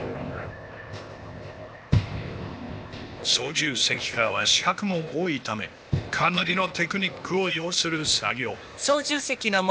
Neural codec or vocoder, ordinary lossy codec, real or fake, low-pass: codec, 16 kHz, 0.8 kbps, ZipCodec; none; fake; none